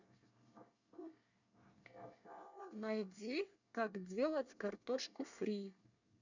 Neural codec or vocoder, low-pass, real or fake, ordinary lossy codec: codec, 24 kHz, 1 kbps, SNAC; 7.2 kHz; fake; none